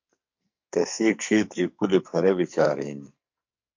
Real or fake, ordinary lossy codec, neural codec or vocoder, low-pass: fake; MP3, 48 kbps; codec, 44.1 kHz, 2.6 kbps, SNAC; 7.2 kHz